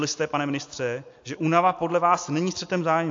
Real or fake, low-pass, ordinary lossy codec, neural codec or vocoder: real; 7.2 kHz; AAC, 48 kbps; none